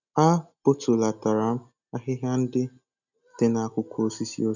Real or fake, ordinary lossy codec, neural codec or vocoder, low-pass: real; none; none; 7.2 kHz